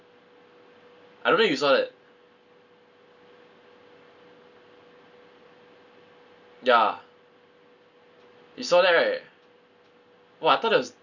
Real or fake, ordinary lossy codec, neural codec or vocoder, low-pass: real; none; none; 7.2 kHz